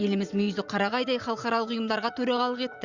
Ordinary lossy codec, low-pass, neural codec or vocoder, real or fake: Opus, 64 kbps; 7.2 kHz; vocoder, 44.1 kHz, 80 mel bands, Vocos; fake